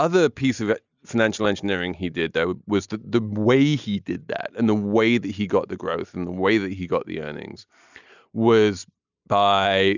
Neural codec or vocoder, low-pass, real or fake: none; 7.2 kHz; real